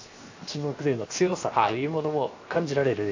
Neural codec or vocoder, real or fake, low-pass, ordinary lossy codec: codec, 16 kHz, 0.7 kbps, FocalCodec; fake; 7.2 kHz; AAC, 48 kbps